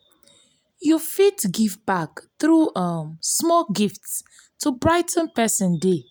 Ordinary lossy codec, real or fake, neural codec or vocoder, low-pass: none; real; none; none